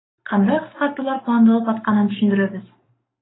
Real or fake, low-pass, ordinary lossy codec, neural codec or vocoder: fake; 7.2 kHz; AAC, 16 kbps; codec, 44.1 kHz, 7.8 kbps, Pupu-Codec